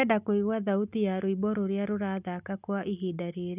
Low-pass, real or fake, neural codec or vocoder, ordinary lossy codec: 3.6 kHz; real; none; none